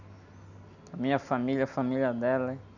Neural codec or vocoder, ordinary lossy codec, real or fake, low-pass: none; none; real; 7.2 kHz